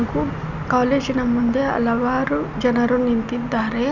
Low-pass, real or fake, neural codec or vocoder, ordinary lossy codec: 7.2 kHz; real; none; Opus, 64 kbps